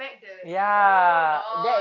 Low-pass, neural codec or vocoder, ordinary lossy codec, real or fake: 7.2 kHz; none; none; real